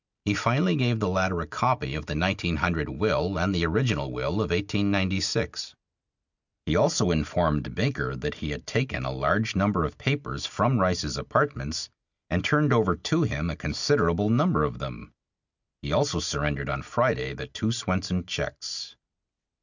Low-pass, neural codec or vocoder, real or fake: 7.2 kHz; none; real